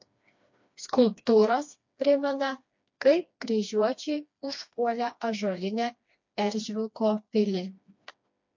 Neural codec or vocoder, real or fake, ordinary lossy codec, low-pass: codec, 16 kHz, 2 kbps, FreqCodec, smaller model; fake; MP3, 48 kbps; 7.2 kHz